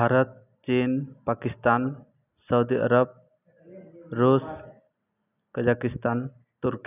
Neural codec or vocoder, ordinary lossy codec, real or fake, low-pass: none; none; real; 3.6 kHz